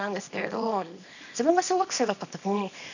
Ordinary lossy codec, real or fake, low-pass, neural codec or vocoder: none; fake; 7.2 kHz; codec, 24 kHz, 0.9 kbps, WavTokenizer, small release